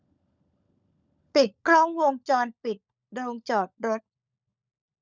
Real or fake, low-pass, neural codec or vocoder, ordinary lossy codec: fake; 7.2 kHz; codec, 16 kHz, 16 kbps, FunCodec, trained on LibriTTS, 50 frames a second; none